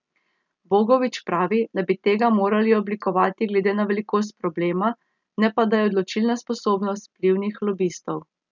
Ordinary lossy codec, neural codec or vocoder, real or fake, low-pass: none; none; real; 7.2 kHz